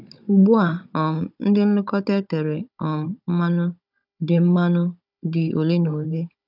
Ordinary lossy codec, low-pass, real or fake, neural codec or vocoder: none; 5.4 kHz; fake; codec, 16 kHz, 16 kbps, FunCodec, trained on Chinese and English, 50 frames a second